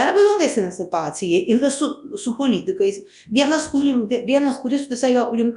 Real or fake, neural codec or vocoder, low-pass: fake; codec, 24 kHz, 0.9 kbps, WavTokenizer, large speech release; 10.8 kHz